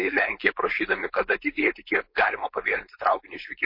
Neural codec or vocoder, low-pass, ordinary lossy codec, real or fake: codec, 16 kHz, 4.8 kbps, FACodec; 5.4 kHz; MP3, 32 kbps; fake